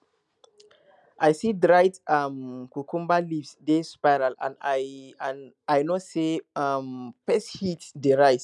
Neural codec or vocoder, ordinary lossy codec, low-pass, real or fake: none; none; none; real